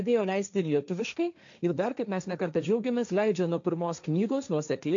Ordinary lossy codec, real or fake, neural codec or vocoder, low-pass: MP3, 64 kbps; fake; codec, 16 kHz, 1.1 kbps, Voila-Tokenizer; 7.2 kHz